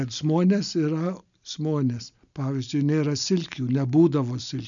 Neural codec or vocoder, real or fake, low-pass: none; real; 7.2 kHz